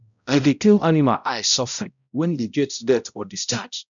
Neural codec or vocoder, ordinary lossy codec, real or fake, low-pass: codec, 16 kHz, 0.5 kbps, X-Codec, HuBERT features, trained on balanced general audio; none; fake; 7.2 kHz